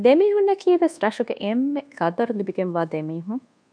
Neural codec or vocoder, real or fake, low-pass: codec, 24 kHz, 1.2 kbps, DualCodec; fake; 9.9 kHz